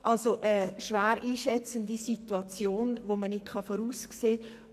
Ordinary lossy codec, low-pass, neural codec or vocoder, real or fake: MP3, 96 kbps; 14.4 kHz; codec, 44.1 kHz, 2.6 kbps, SNAC; fake